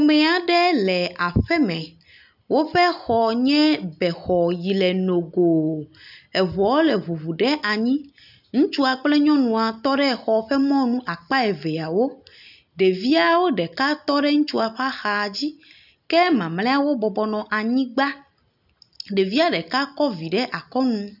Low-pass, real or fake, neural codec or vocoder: 5.4 kHz; real; none